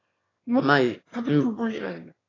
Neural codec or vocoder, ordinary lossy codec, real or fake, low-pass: autoencoder, 22.05 kHz, a latent of 192 numbers a frame, VITS, trained on one speaker; AAC, 32 kbps; fake; 7.2 kHz